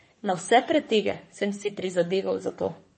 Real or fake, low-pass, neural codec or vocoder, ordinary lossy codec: fake; 10.8 kHz; codec, 44.1 kHz, 3.4 kbps, Pupu-Codec; MP3, 32 kbps